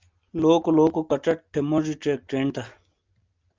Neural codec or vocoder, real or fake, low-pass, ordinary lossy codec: none; real; 7.2 kHz; Opus, 32 kbps